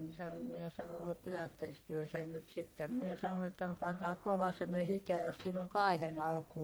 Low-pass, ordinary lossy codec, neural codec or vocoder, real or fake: none; none; codec, 44.1 kHz, 1.7 kbps, Pupu-Codec; fake